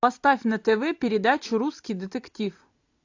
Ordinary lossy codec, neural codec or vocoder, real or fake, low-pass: AAC, 48 kbps; none; real; 7.2 kHz